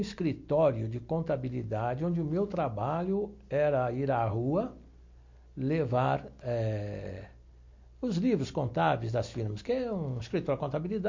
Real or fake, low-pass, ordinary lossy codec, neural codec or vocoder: real; 7.2 kHz; MP3, 48 kbps; none